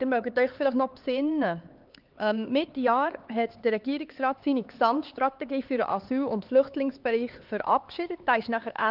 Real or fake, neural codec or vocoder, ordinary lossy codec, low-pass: fake; codec, 16 kHz, 4 kbps, X-Codec, HuBERT features, trained on LibriSpeech; Opus, 32 kbps; 5.4 kHz